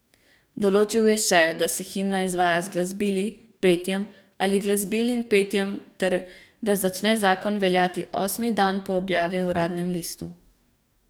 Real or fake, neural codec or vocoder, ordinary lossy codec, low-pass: fake; codec, 44.1 kHz, 2.6 kbps, DAC; none; none